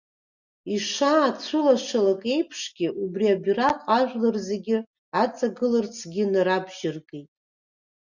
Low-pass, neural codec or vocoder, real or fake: 7.2 kHz; none; real